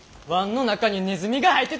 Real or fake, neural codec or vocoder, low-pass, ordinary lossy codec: real; none; none; none